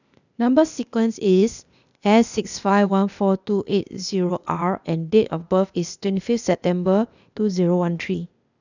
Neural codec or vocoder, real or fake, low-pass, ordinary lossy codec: codec, 16 kHz, 0.8 kbps, ZipCodec; fake; 7.2 kHz; none